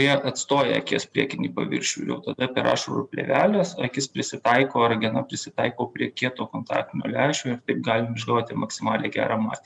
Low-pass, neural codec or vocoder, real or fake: 10.8 kHz; none; real